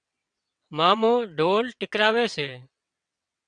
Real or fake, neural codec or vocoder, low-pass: fake; vocoder, 22.05 kHz, 80 mel bands, WaveNeXt; 9.9 kHz